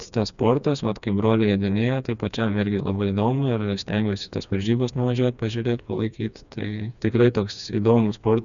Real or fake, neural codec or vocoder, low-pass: fake; codec, 16 kHz, 2 kbps, FreqCodec, smaller model; 7.2 kHz